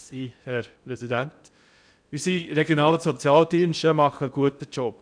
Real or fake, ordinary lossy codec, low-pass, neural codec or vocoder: fake; none; 9.9 kHz; codec, 16 kHz in and 24 kHz out, 0.6 kbps, FocalCodec, streaming, 2048 codes